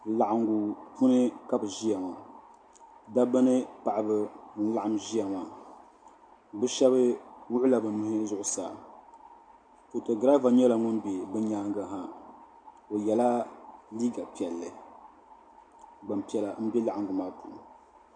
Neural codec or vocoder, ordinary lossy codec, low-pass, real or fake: none; MP3, 64 kbps; 9.9 kHz; real